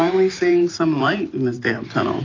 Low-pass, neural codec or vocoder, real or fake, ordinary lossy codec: 7.2 kHz; vocoder, 44.1 kHz, 128 mel bands every 512 samples, BigVGAN v2; fake; AAC, 32 kbps